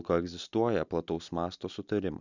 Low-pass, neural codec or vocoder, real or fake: 7.2 kHz; none; real